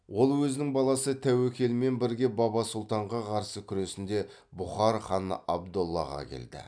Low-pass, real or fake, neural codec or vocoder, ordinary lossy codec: 9.9 kHz; real; none; none